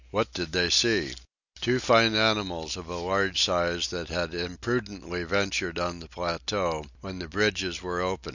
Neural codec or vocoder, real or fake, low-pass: none; real; 7.2 kHz